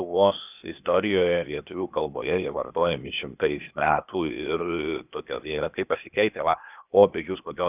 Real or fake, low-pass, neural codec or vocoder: fake; 3.6 kHz; codec, 16 kHz, 0.8 kbps, ZipCodec